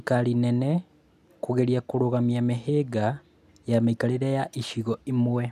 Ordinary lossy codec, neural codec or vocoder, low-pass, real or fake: none; none; 14.4 kHz; real